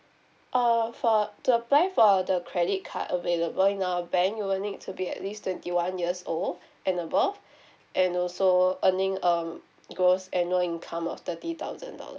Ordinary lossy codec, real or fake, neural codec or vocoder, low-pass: none; real; none; none